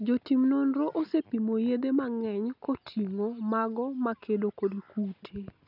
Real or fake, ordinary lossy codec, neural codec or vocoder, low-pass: real; none; none; 5.4 kHz